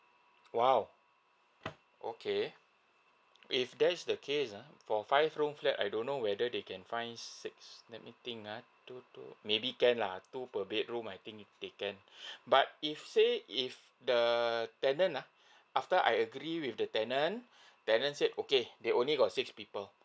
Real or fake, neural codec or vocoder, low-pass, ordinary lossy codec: real; none; none; none